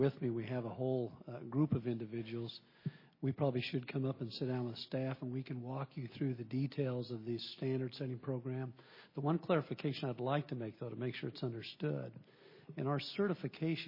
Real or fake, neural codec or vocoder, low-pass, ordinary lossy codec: real; none; 5.4 kHz; MP3, 24 kbps